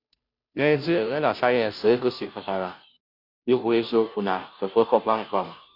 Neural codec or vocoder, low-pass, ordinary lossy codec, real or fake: codec, 16 kHz, 0.5 kbps, FunCodec, trained on Chinese and English, 25 frames a second; 5.4 kHz; none; fake